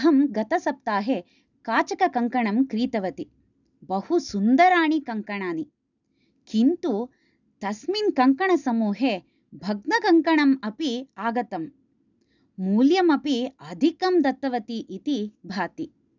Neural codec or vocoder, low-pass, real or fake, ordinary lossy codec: none; 7.2 kHz; real; none